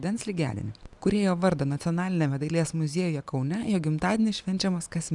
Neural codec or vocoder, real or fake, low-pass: none; real; 10.8 kHz